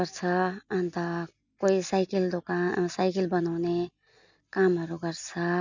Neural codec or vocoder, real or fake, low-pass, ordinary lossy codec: none; real; 7.2 kHz; none